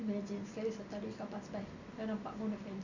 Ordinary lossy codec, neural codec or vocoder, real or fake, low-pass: none; none; real; 7.2 kHz